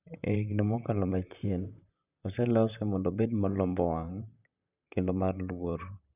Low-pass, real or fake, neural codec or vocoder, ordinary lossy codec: 3.6 kHz; fake; vocoder, 44.1 kHz, 128 mel bands, Pupu-Vocoder; none